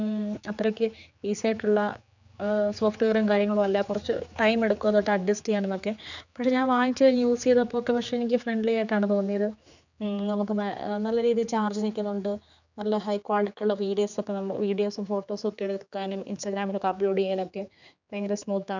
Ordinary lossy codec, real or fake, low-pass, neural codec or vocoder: none; fake; 7.2 kHz; codec, 16 kHz, 4 kbps, X-Codec, HuBERT features, trained on general audio